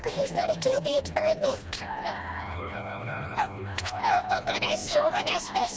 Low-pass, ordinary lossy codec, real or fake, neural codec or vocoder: none; none; fake; codec, 16 kHz, 1 kbps, FreqCodec, smaller model